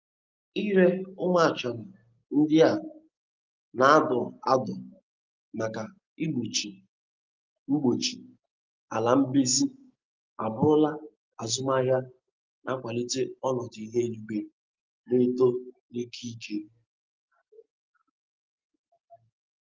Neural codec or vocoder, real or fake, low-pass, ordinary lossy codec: none; real; 7.2 kHz; Opus, 24 kbps